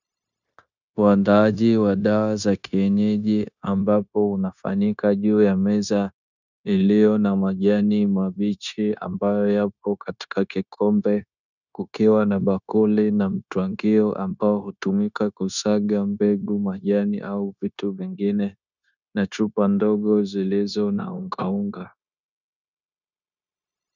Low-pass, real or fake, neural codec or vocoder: 7.2 kHz; fake; codec, 16 kHz, 0.9 kbps, LongCat-Audio-Codec